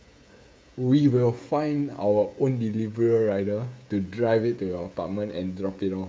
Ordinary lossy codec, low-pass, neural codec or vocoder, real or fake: none; none; codec, 16 kHz, 16 kbps, FreqCodec, smaller model; fake